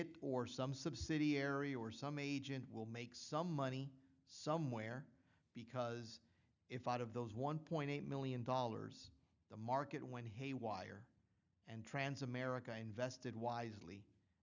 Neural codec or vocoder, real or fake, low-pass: none; real; 7.2 kHz